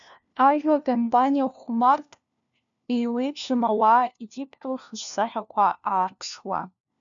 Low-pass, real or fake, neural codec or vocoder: 7.2 kHz; fake; codec, 16 kHz, 1 kbps, FunCodec, trained on LibriTTS, 50 frames a second